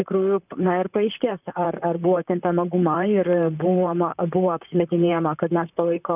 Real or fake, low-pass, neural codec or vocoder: fake; 3.6 kHz; vocoder, 44.1 kHz, 128 mel bands, Pupu-Vocoder